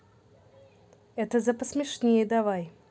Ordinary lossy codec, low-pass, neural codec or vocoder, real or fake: none; none; none; real